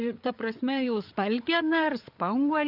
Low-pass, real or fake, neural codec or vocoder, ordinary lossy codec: 5.4 kHz; fake; codec, 16 kHz, 8 kbps, FreqCodec, larger model; AAC, 48 kbps